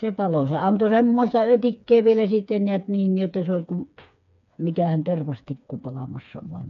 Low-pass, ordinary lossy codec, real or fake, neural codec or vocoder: 7.2 kHz; none; fake; codec, 16 kHz, 4 kbps, FreqCodec, smaller model